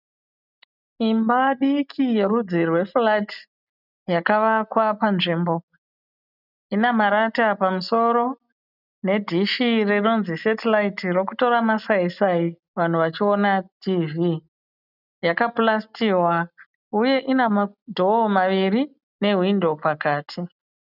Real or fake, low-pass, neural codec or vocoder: real; 5.4 kHz; none